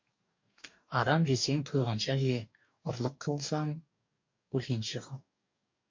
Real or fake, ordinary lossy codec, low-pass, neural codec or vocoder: fake; MP3, 48 kbps; 7.2 kHz; codec, 44.1 kHz, 2.6 kbps, DAC